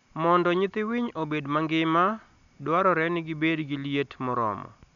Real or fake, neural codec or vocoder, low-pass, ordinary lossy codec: real; none; 7.2 kHz; none